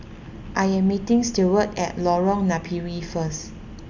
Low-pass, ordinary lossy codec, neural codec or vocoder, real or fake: 7.2 kHz; none; none; real